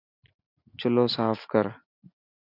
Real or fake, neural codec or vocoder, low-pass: real; none; 5.4 kHz